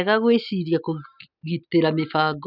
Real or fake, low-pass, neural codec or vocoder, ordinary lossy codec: real; 5.4 kHz; none; none